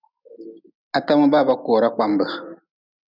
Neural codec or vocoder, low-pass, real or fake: none; 5.4 kHz; real